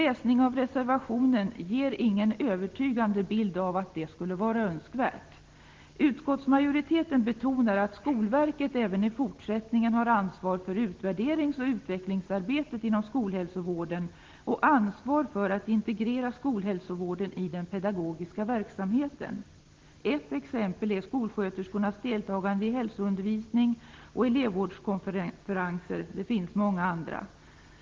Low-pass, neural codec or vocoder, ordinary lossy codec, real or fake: 7.2 kHz; none; Opus, 16 kbps; real